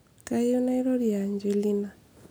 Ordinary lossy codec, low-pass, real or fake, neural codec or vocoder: none; none; real; none